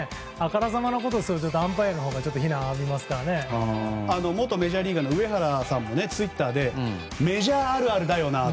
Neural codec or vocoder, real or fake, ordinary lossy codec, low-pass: none; real; none; none